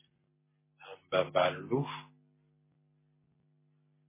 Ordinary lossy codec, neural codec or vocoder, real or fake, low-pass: MP3, 16 kbps; none; real; 3.6 kHz